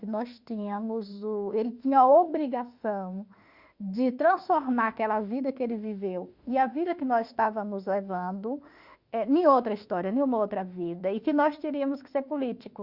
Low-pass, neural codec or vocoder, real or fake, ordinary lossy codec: 5.4 kHz; autoencoder, 48 kHz, 32 numbers a frame, DAC-VAE, trained on Japanese speech; fake; Opus, 64 kbps